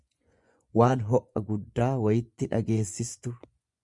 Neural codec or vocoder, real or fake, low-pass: vocoder, 24 kHz, 100 mel bands, Vocos; fake; 10.8 kHz